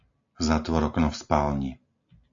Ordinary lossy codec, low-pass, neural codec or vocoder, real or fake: AAC, 64 kbps; 7.2 kHz; none; real